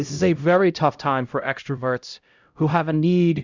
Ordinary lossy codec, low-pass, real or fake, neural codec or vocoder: Opus, 64 kbps; 7.2 kHz; fake; codec, 16 kHz, 0.5 kbps, X-Codec, HuBERT features, trained on LibriSpeech